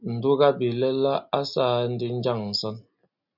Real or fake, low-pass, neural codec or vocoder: real; 5.4 kHz; none